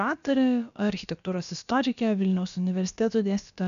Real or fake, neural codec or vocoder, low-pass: fake; codec, 16 kHz, about 1 kbps, DyCAST, with the encoder's durations; 7.2 kHz